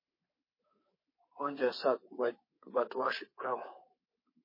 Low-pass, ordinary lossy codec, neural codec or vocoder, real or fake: 5.4 kHz; MP3, 24 kbps; codec, 24 kHz, 3.1 kbps, DualCodec; fake